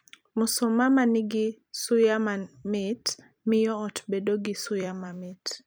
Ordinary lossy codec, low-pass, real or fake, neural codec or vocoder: none; none; fake; vocoder, 44.1 kHz, 128 mel bands every 256 samples, BigVGAN v2